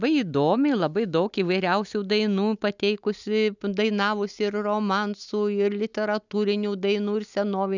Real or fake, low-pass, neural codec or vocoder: real; 7.2 kHz; none